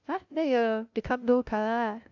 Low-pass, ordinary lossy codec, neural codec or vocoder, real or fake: 7.2 kHz; AAC, 48 kbps; codec, 16 kHz, 0.5 kbps, FunCodec, trained on LibriTTS, 25 frames a second; fake